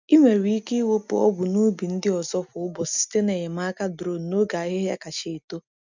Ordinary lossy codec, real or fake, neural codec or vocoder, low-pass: none; real; none; 7.2 kHz